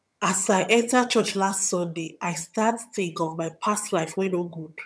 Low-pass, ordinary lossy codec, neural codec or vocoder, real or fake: none; none; vocoder, 22.05 kHz, 80 mel bands, HiFi-GAN; fake